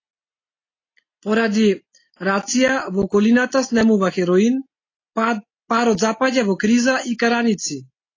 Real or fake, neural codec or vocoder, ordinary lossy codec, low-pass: real; none; AAC, 32 kbps; 7.2 kHz